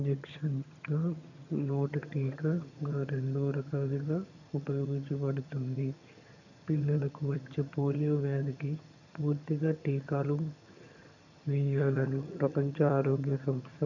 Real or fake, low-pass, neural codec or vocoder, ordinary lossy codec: fake; 7.2 kHz; vocoder, 22.05 kHz, 80 mel bands, HiFi-GAN; none